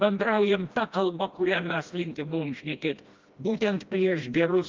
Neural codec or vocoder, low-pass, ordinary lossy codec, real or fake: codec, 16 kHz, 1 kbps, FreqCodec, smaller model; 7.2 kHz; Opus, 24 kbps; fake